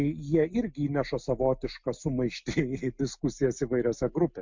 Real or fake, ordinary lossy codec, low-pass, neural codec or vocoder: real; MP3, 64 kbps; 7.2 kHz; none